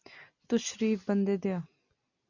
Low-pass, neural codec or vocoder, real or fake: 7.2 kHz; none; real